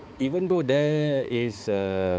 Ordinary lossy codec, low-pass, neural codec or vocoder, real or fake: none; none; codec, 16 kHz, 4 kbps, X-Codec, HuBERT features, trained on balanced general audio; fake